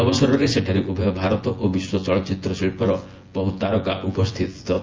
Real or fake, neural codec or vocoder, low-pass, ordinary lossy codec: fake; vocoder, 24 kHz, 100 mel bands, Vocos; 7.2 kHz; Opus, 24 kbps